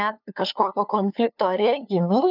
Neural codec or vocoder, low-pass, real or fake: codec, 16 kHz, 2 kbps, FunCodec, trained on LibriTTS, 25 frames a second; 5.4 kHz; fake